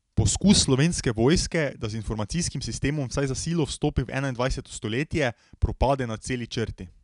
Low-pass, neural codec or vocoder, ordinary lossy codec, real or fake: 10.8 kHz; none; none; real